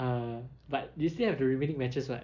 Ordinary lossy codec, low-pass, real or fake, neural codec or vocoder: none; 7.2 kHz; real; none